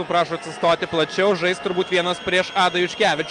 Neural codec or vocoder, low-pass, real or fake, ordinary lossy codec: none; 9.9 kHz; real; Opus, 32 kbps